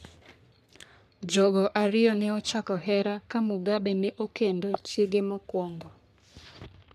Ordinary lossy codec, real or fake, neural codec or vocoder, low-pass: none; fake; codec, 44.1 kHz, 3.4 kbps, Pupu-Codec; 14.4 kHz